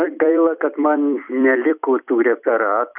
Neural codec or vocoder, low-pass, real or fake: vocoder, 44.1 kHz, 128 mel bands every 256 samples, BigVGAN v2; 3.6 kHz; fake